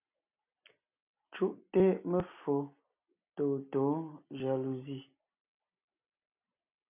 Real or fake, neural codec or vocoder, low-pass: real; none; 3.6 kHz